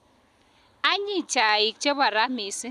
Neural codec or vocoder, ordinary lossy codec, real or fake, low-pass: none; none; real; 14.4 kHz